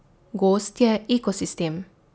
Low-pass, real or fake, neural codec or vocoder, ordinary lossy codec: none; real; none; none